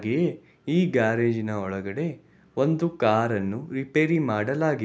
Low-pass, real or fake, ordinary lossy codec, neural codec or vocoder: none; real; none; none